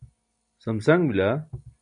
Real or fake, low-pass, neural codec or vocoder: real; 9.9 kHz; none